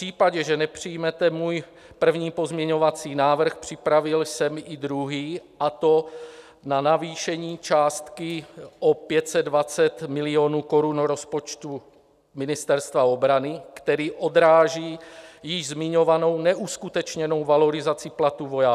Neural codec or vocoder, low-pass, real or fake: none; 14.4 kHz; real